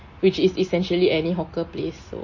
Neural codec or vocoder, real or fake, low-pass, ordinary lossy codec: none; real; 7.2 kHz; MP3, 32 kbps